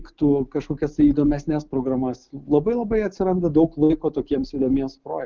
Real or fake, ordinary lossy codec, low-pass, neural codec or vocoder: real; Opus, 32 kbps; 7.2 kHz; none